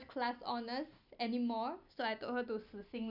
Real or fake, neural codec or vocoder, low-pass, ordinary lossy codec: real; none; 5.4 kHz; none